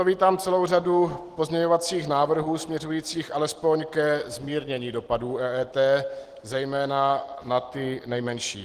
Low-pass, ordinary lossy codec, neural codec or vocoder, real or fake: 14.4 kHz; Opus, 16 kbps; none; real